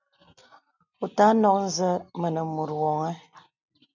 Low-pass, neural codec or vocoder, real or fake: 7.2 kHz; none; real